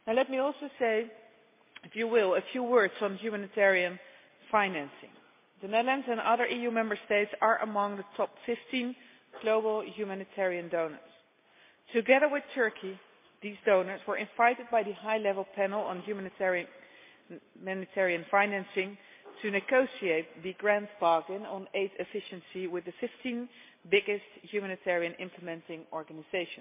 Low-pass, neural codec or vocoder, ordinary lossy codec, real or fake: 3.6 kHz; none; MP3, 24 kbps; real